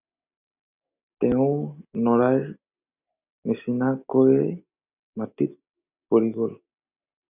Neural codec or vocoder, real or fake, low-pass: none; real; 3.6 kHz